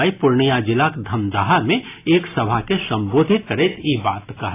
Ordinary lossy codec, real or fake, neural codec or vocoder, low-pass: AAC, 24 kbps; real; none; 3.6 kHz